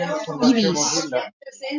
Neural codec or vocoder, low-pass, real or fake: none; 7.2 kHz; real